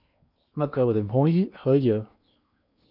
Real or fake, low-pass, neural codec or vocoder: fake; 5.4 kHz; codec, 16 kHz in and 24 kHz out, 0.8 kbps, FocalCodec, streaming, 65536 codes